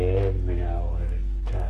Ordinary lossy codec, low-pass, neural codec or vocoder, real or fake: Opus, 24 kbps; 14.4 kHz; codec, 44.1 kHz, 7.8 kbps, Pupu-Codec; fake